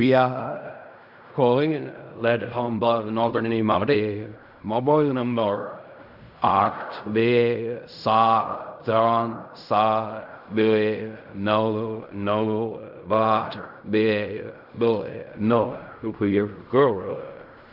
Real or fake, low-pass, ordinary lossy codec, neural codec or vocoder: fake; 5.4 kHz; none; codec, 16 kHz in and 24 kHz out, 0.4 kbps, LongCat-Audio-Codec, fine tuned four codebook decoder